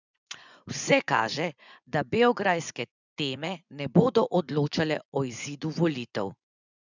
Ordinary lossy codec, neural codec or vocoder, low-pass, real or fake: none; vocoder, 24 kHz, 100 mel bands, Vocos; 7.2 kHz; fake